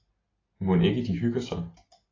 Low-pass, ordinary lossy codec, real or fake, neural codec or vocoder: 7.2 kHz; AAC, 32 kbps; real; none